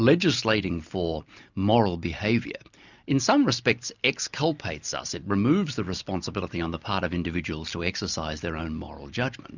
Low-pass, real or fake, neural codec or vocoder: 7.2 kHz; real; none